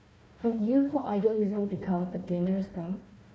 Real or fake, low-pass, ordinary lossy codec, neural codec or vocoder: fake; none; none; codec, 16 kHz, 1 kbps, FunCodec, trained on Chinese and English, 50 frames a second